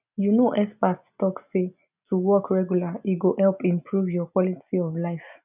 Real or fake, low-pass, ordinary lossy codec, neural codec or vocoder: real; 3.6 kHz; none; none